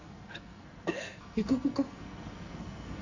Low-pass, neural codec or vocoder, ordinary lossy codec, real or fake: 7.2 kHz; codec, 44.1 kHz, 2.6 kbps, SNAC; Opus, 64 kbps; fake